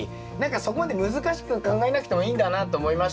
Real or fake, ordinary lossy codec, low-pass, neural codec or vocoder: real; none; none; none